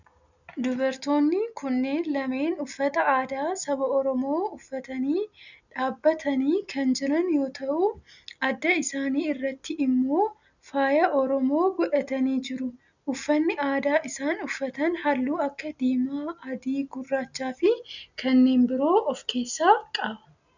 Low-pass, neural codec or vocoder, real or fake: 7.2 kHz; none; real